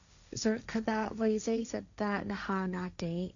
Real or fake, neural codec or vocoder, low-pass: fake; codec, 16 kHz, 1.1 kbps, Voila-Tokenizer; 7.2 kHz